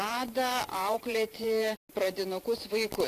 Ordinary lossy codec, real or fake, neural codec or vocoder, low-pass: AAC, 64 kbps; fake; vocoder, 44.1 kHz, 128 mel bands every 512 samples, BigVGAN v2; 14.4 kHz